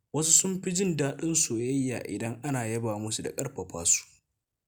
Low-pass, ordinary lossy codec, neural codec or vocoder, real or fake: none; none; none; real